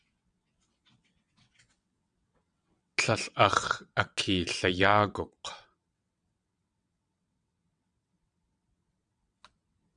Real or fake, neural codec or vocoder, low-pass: fake; vocoder, 22.05 kHz, 80 mel bands, WaveNeXt; 9.9 kHz